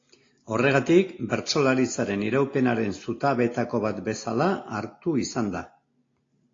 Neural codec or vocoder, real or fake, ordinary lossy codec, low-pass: none; real; AAC, 32 kbps; 7.2 kHz